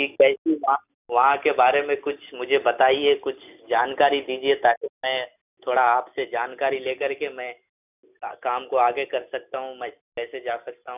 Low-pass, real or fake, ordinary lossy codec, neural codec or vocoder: 3.6 kHz; real; none; none